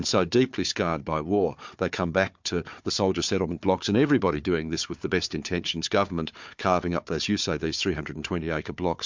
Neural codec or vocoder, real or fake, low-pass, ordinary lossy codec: codec, 16 kHz, 4 kbps, FunCodec, trained on Chinese and English, 50 frames a second; fake; 7.2 kHz; MP3, 64 kbps